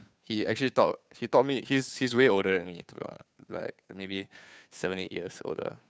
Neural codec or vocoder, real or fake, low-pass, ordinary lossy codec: codec, 16 kHz, 2 kbps, FunCodec, trained on Chinese and English, 25 frames a second; fake; none; none